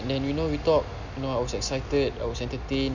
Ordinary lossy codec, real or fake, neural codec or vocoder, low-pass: none; real; none; 7.2 kHz